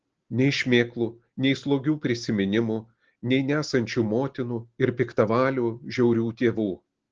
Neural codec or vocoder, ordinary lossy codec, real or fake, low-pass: none; Opus, 16 kbps; real; 7.2 kHz